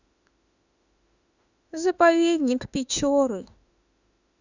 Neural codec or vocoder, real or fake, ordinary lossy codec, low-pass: autoencoder, 48 kHz, 32 numbers a frame, DAC-VAE, trained on Japanese speech; fake; none; 7.2 kHz